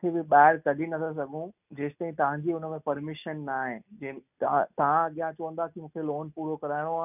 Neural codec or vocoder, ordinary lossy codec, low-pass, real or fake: none; none; 3.6 kHz; real